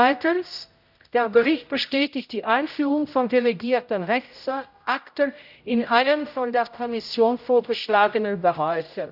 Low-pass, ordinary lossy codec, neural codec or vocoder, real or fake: 5.4 kHz; none; codec, 16 kHz, 0.5 kbps, X-Codec, HuBERT features, trained on general audio; fake